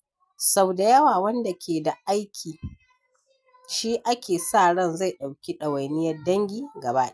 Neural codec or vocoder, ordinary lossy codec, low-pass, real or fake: none; none; none; real